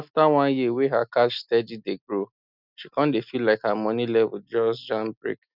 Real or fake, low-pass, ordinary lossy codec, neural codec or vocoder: real; 5.4 kHz; none; none